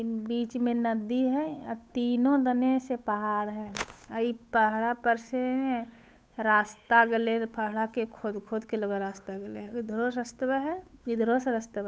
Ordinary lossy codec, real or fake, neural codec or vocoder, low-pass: none; fake; codec, 16 kHz, 8 kbps, FunCodec, trained on Chinese and English, 25 frames a second; none